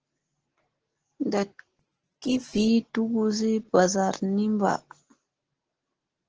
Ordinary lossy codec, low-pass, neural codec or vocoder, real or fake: Opus, 16 kbps; 7.2 kHz; none; real